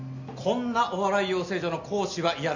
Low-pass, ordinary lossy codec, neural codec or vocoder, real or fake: 7.2 kHz; none; none; real